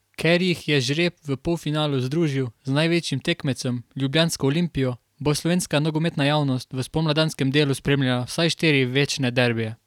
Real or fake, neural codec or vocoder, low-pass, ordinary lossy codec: real; none; 19.8 kHz; none